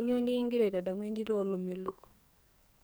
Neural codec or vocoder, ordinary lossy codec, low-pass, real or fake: codec, 44.1 kHz, 2.6 kbps, SNAC; none; none; fake